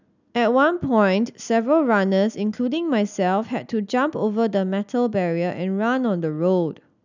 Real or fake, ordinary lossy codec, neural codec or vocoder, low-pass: real; none; none; 7.2 kHz